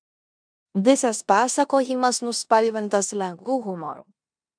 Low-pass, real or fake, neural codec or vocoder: 9.9 kHz; fake; codec, 16 kHz in and 24 kHz out, 0.9 kbps, LongCat-Audio-Codec, four codebook decoder